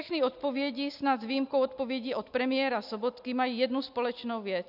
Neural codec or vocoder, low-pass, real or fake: none; 5.4 kHz; real